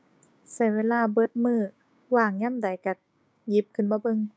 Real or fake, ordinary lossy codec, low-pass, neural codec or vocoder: fake; none; none; codec, 16 kHz, 6 kbps, DAC